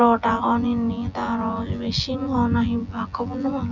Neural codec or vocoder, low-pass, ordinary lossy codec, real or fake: vocoder, 24 kHz, 100 mel bands, Vocos; 7.2 kHz; none; fake